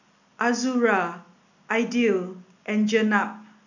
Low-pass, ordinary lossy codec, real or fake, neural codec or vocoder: 7.2 kHz; none; real; none